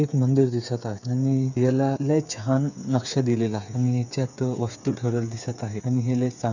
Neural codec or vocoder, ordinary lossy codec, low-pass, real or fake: codec, 16 kHz, 8 kbps, FreqCodec, smaller model; none; 7.2 kHz; fake